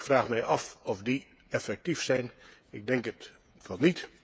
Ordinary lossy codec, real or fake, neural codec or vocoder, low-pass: none; fake; codec, 16 kHz, 8 kbps, FreqCodec, smaller model; none